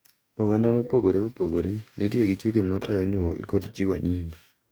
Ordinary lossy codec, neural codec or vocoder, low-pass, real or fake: none; codec, 44.1 kHz, 2.6 kbps, DAC; none; fake